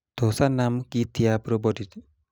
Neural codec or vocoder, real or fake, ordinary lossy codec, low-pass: none; real; none; none